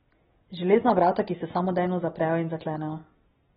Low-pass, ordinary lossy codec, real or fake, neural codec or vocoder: 7.2 kHz; AAC, 16 kbps; real; none